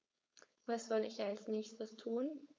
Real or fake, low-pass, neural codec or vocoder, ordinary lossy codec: fake; none; codec, 16 kHz, 4.8 kbps, FACodec; none